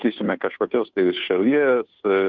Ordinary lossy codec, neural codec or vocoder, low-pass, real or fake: MP3, 64 kbps; codec, 16 kHz, 2 kbps, FunCodec, trained on Chinese and English, 25 frames a second; 7.2 kHz; fake